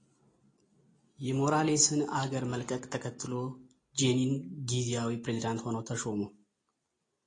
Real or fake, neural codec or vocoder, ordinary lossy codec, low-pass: real; none; AAC, 32 kbps; 9.9 kHz